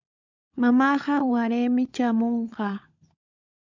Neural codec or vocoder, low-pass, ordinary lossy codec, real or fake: codec, 16 kHz, 16 kbps, FunCodec, trained on LibriTTS, 50 frames a second; 7.2 kHz; MP3, 64 kbps; fake